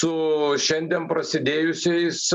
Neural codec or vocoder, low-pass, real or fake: none; 9.9 kHz; real